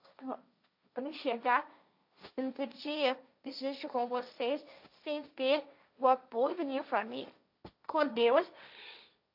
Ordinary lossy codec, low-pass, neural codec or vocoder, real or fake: AAC, 48 kbps; 5.4 kHz; codec, 16 kHz, 1.1 kbps, Voila-Tokenizer; fake